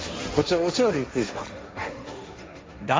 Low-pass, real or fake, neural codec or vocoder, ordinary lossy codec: none; fake; codec, 16 kHz, 1.1 kbps, Voila-Tokenizer; none